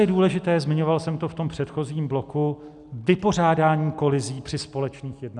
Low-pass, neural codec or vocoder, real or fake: 10.8 kHz; none; real